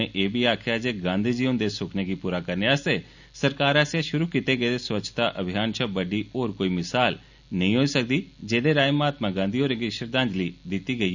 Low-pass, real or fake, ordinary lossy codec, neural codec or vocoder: 7.2 kHz; real; none; none